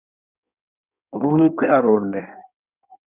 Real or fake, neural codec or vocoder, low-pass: fake; codec, 16 kHz in and 24 kHz out, 1.1 kbps, FireRedTTS-2 codec; 3.6 kHz